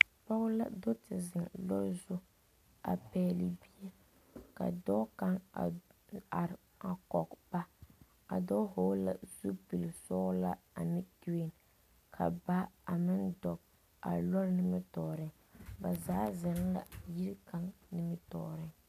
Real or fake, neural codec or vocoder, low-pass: real; none; 14.4 kHz